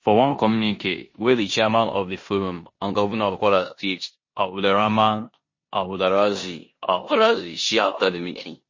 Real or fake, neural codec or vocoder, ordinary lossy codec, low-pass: fake; codec, 16 kHz in and 24 kHz out, 0.9 kbps, LongCat-Audio-Codec, four codebook decoder; MP3, 32 kbps; 7.2 kHz